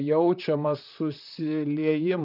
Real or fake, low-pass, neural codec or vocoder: fake; 5.4 kHz; vocoder, 44.1 kHz, 128 mel bands, Pupu-Vocoder